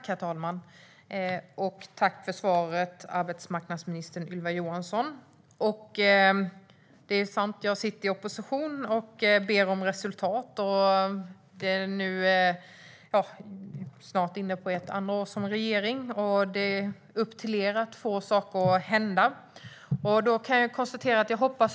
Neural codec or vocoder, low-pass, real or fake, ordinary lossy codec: none; none; real; none